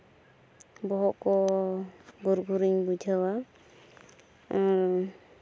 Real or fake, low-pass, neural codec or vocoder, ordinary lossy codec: real; none; none; none